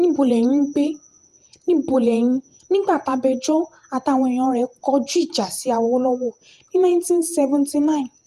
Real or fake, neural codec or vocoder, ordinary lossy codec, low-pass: fake; vocoder, 48 kHz, 128 mel bands, Vocos; Opus, 32 kbps; 14.4 kHz